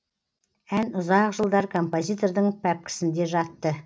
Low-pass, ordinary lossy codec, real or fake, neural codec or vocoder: none; none; real; none